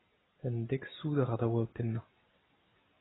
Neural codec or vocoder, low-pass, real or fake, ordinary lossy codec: none; 7.2 kHz; real; AAC, 16 kbps